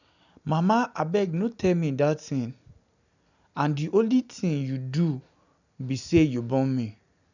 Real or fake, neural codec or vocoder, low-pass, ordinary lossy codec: real; none; 7.2 kHz; none